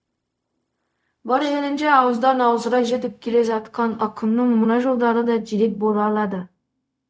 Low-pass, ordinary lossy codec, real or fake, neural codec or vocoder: none; none; fake; codec, 16 kHz, 0.4 kbps, LongCat-Audio-Codec